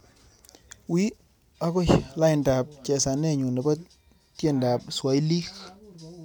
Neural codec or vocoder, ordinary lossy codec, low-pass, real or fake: none; none; none; real